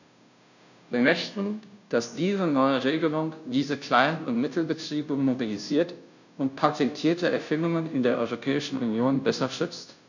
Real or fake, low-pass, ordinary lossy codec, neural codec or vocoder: fake; 7.2 kHz; none; codec, 16 kHz, 0.5 kbps, FunCodec, trained on Chinese and English, 25 frames a second